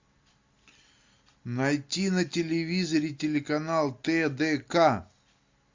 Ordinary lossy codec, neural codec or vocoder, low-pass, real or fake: MP3, 64 kbps; none; 7.2 kHz; real